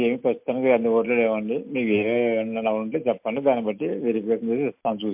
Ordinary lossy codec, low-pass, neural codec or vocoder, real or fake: MP3, 32 kbps; 3.6 kHz; none; real